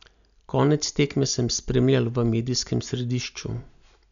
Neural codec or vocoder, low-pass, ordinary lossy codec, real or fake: none; 7.2 kHz; none; real